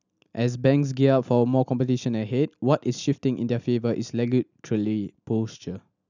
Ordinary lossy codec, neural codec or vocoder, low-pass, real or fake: none; none; 7.2 kHz; real